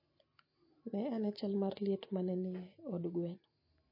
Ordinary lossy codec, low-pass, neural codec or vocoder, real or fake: MP3, 24 kbps; 5.4 kHz; none; real